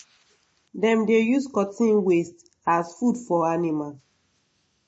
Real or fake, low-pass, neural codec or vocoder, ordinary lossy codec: real; 10.8 kHz; none; MP3, 32 kbps